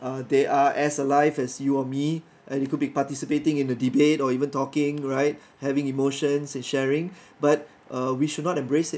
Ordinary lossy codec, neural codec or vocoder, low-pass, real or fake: none; none; none; real